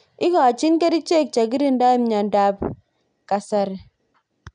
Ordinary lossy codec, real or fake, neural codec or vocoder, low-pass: none; real; none; 9.9 kHz